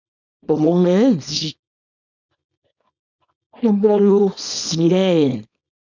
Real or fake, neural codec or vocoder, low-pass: fake; codec, 24 kHz, 0.9 kbps, WavTokenizer, small release; 7.2 kHz